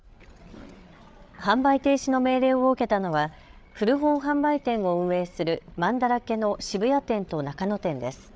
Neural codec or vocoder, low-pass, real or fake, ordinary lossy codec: codec, 16 kHz, 8 kbps, FreqCodec, larger model; none; fake; none